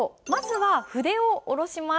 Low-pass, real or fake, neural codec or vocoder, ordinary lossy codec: none; real; none; none